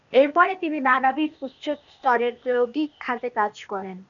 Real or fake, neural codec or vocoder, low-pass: fake; codec, 16 kHz, 0.8 kbps, ZipCodec; 7.2 kHz